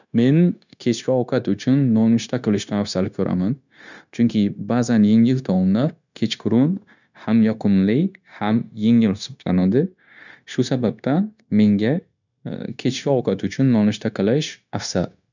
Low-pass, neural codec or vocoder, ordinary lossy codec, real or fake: 7.2 kHz; codec, 16 kHz, 0.9 kbps, LongCat-Audio-Codec; none; fake